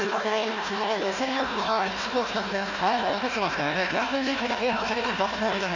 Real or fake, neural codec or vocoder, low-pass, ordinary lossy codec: fake; codec, 16 kHz, 1 kbps, FunCodec, trained on Chinese and English, 50 frames a second; 7.2 kHz; none